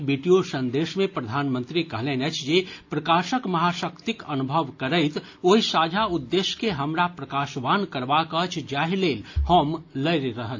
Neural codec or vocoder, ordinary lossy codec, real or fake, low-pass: none; AAC, 48 kbps; real; 7.2 kHz